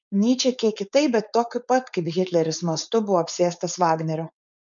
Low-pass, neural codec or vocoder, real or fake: 7.2 kHz; codec, 16 kHz, 4.8 kbps, FACodec; fake